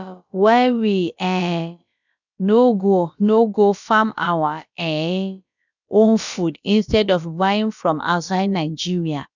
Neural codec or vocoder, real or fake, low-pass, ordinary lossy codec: codec, 16 kHz, about 1 kbps, DyCAST, with the encoder's durations; fake; 7.2 kHz; none